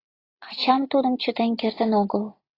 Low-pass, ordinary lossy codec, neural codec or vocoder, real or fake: 5.4 kHz; AAC, 24 kbps; none; real